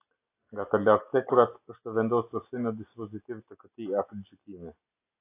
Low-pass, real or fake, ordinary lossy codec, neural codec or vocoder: 3.6 kHz; real; AAC, 24 kbps; none